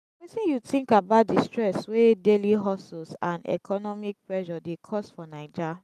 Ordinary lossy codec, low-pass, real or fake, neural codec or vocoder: none; 14.4 kHz; real; none